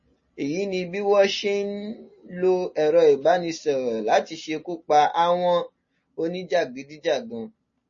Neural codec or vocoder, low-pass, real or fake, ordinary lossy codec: none; 7.2 kHz; real; MP3, 32 kbps